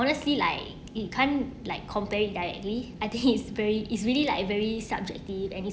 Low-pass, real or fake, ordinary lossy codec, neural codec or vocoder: none; real; none; none